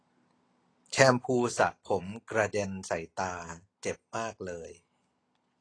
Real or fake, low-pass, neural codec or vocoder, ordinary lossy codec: real; 9.9 kHz; none; AAC, 32 kbps